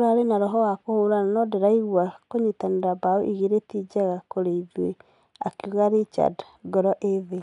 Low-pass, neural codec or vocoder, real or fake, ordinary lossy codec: 10.8 kHz; none; real; none